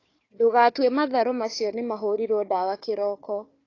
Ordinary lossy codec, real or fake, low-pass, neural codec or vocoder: AAC, 32 kbps; fake; 7.2 kHz; codec, 16 kHz, 4 kbps, FunCodec, trained on Chinese and English, 50 frames a second